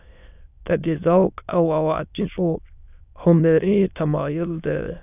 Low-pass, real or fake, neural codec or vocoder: 3.6 kHz; fake; autoencoder, 22.05 kHz, a latent of 192 numbers a frame, VITS, trained on many speakers